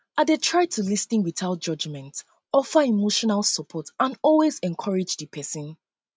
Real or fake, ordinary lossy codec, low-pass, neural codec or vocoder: real; none; none; none